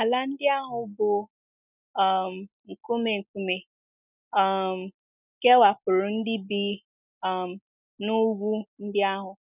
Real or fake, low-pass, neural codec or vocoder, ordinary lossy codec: real; 3.6 kHz; none; none